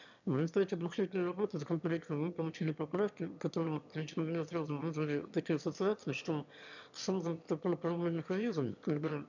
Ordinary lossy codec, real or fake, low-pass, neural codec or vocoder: none; fake; 7.2 kHz; autoencoder, 22.05 kHz, a latent of 192 numbers a frame, VITS, trained on one speaker